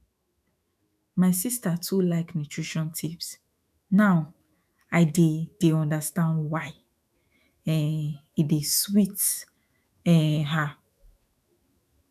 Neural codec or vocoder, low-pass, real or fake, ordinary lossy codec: autoencoder, 48 kHz, 128 numbers a frame, DAC-VAE, trained on Japanese speech; 14.4 kHz; fake; none